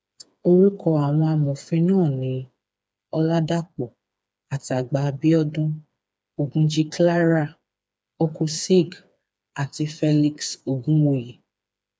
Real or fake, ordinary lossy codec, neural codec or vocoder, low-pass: fake; none; codec, 16 kHz, 4 kbps, FreqCodec, smaller model; none